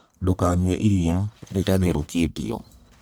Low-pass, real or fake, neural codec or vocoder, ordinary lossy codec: none; fake; codec, 44.1 kHz, 1.7 kbps, Pupu-Codec; none